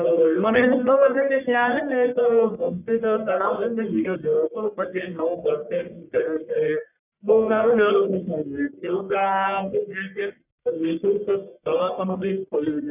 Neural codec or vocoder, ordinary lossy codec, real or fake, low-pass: codec, 44.1 kHz, 1.7 kbps, Pupu-Codec; none; fake; 3.6 kHz